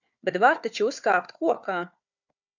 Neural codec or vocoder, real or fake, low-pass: codec, 16 kHz, 4 kbps, FunCodec, trained on Chinese and English, 50 frames a second; fake; 7.2 kHz